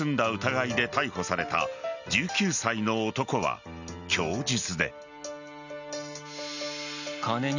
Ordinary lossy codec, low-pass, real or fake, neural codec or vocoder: none; 7.2 kHz; real; none